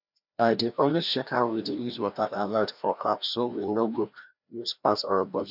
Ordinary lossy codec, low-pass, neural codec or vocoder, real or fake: none; 5.4 kHz; codec, 16 kHz, 1 kbps, FreqCodec, larger model; fake